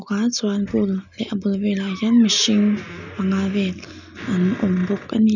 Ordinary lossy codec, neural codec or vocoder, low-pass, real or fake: none; none; 7.2 kHz; real